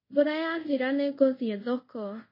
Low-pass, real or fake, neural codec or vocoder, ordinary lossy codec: 5.4 kHz; fake; codec, 24 kHz, 0.5 kbps, DualCodec; MP3, 24 kbps